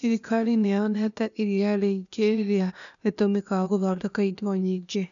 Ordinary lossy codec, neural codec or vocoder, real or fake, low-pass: none; codec, 16 kHz, 0.8 kbps, ZipCodec; fake; 7.2 kHz